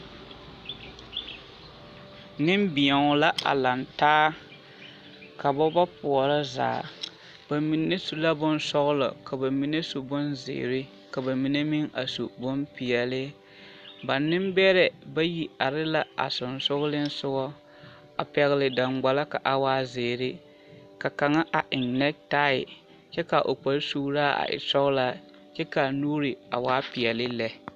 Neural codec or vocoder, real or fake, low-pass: none; real; 14.4 kHz